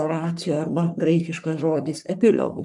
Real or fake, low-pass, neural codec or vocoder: fake; 10.8 kHz; codec, 44.1 kHz, 3.4 kbps, Pupu-Codec